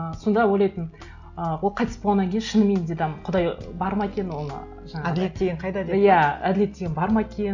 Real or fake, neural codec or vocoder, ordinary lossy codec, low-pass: real; none; none; 7.2 kHz